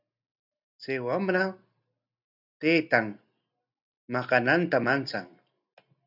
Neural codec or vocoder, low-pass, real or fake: none; 5.4 kHz; real